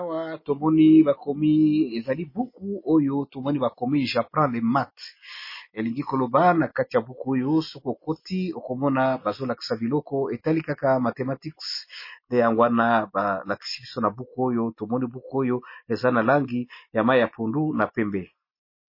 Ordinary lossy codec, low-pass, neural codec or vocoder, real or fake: MP3, 24 kbps; 5.4 kHz; none; real